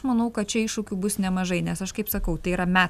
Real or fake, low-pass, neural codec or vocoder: real; 14.4 kHz; none